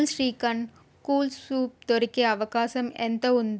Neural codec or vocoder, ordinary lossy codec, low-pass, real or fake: none; none; none; real